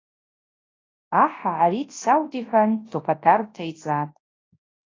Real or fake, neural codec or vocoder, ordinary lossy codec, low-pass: fake; codec, 24 kHz, 0.9 kbps, WavTokenizer, large speech release; AAC, 32 kbps; 7.2 kHz